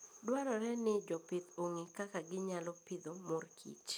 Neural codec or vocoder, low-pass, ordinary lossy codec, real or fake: none; none; none; real